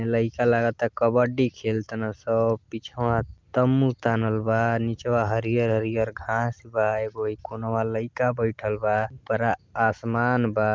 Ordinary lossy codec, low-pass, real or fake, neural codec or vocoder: Opus, 24 kbps; 7.2 kHz; real; none